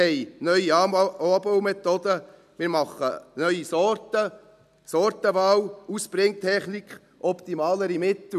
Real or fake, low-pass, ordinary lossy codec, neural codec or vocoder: real; 14.4 kHz; none; none